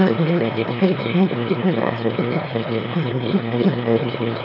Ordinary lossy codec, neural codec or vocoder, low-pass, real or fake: none; autoencoder, 22.05 kHz, a latent of 192 numbers a frame, VITS, trained on one speaker; 5.4 kHz; fake